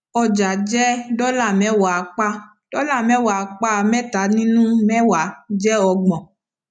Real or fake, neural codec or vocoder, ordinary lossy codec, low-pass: real; none; none; 9.9 kHz